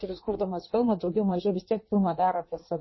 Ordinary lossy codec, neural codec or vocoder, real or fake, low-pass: MP3, 24 kbps; codec, 16 kHz in and 24 kHz out, 1.1 kbps, FireRedTTS-2 codec; fake; 7.2 kHz